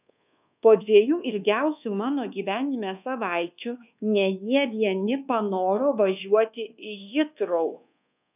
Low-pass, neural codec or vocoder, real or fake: 3.6 kHz; codec, 24 kHz, 1.2 kbps, DualCodec; fake